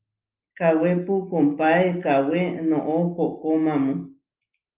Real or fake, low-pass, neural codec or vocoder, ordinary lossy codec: real; 3.6 kHz; none; Opus, 32 kbps